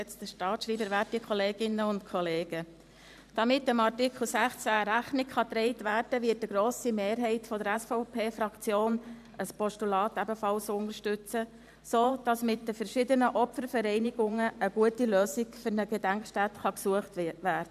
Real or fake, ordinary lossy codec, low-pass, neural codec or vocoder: fake; none; 14.4 kHz; vocoder, 44.1 kHz, 128 mel bands every 512 samples, BigVGAN v2